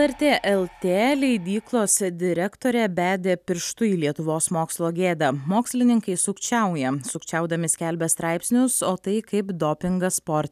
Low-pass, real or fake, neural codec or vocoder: 14.4 kHz; real; none